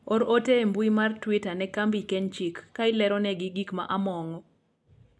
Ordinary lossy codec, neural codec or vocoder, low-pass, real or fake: none; none; none; real